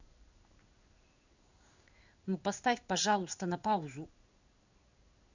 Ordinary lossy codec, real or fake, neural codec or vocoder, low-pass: Opus, 64 kbps; fake; codec, 16 kHz in and 24 kHz out, 1 kbps, XY-Tokenizer; 7.2 kHz